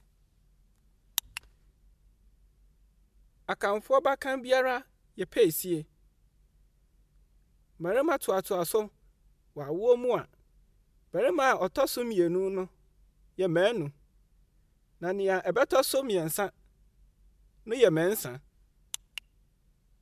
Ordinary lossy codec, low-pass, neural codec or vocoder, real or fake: MP3, 96 kbps; 14.4 kHz; none; real